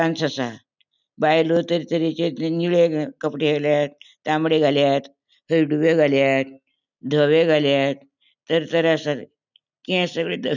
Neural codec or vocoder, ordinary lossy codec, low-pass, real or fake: none; none; 7.2 kHz; real